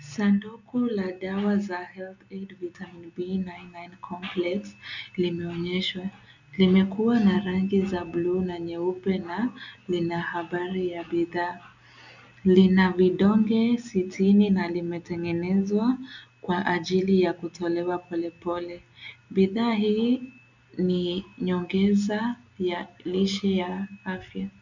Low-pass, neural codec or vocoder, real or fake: 7.2 kHz; none; real